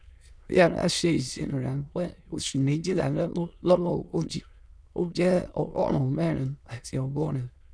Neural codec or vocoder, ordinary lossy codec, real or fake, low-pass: autoencoder, 22.05 kHz, a latent of 192 numbers a frame, VITS, trained on many speakers; none; fake; none